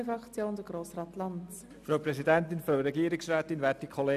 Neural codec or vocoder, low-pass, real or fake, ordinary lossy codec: none; 14.4 kHz; real; none